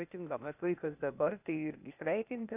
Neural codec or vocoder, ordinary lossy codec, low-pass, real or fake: codec, 16 kHz, 0.8 kbps, ZipCodec; AAC, 32 kbps; 3.6 kHz; fake